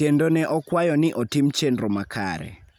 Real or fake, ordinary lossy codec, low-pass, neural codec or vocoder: real; none; 19.8 kHz; none